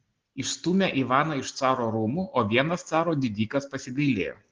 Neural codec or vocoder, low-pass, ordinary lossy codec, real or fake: none; 7.2 kHz; Opus, 16 kbps; real